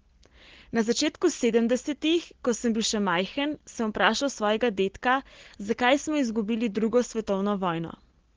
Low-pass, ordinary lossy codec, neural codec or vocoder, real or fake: 7.2 kHz; Opus, 16 kbps; none; real